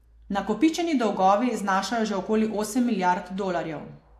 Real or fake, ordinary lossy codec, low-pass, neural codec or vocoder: real; AAC, 64 kbps; 14.4 kHz; none